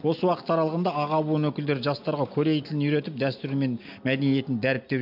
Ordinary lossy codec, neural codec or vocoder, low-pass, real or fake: MP3, 48 kbps; none; 5.4 kHz; real